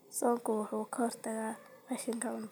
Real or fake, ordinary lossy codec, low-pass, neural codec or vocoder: real; none; none; none